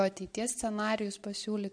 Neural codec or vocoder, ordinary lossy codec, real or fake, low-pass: none; AAC, 64 kbps; real; 9.9 kHz